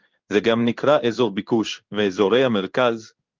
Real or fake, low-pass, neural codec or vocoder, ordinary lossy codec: fake; 7.2 kHz; codec, 16 kHz in and 24 kHz out, 1 kbps, XY-Tokenizer; Opus, 32 kbps